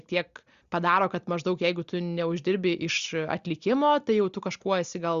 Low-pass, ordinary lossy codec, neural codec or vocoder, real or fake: 7.2 kHz; Opus, 64 kbps; none; real